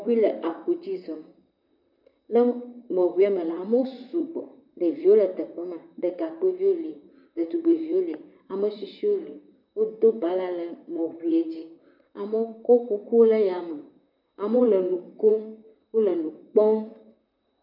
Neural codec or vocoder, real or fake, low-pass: vocoder, 44.1 kHz, 128 mel bands, Pupu-Vocoder; fake; 5.4 kHz